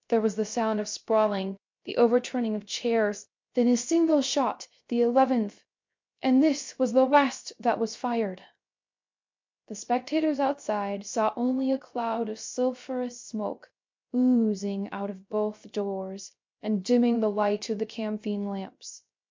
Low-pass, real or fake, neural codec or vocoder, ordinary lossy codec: 7.2 kHz; fake; codec, 16 kHz, 0.3 kbps, FocalCodec; MP3, 48 kbps